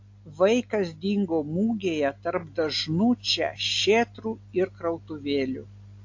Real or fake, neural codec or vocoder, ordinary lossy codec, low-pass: real; none; AAC, 48 kbps; 7.2 kHz